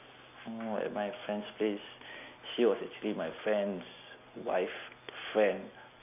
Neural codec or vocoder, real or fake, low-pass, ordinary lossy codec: none; real; 3.6 kHz; none